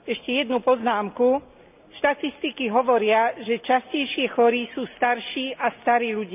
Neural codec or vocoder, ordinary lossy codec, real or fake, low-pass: none; none; real; 3.6 kHz